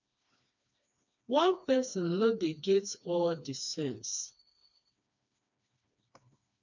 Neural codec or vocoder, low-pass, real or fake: codec, 16 kHz, 2 kbps, FreqCodec, smaller model; 7.2 kHz; fake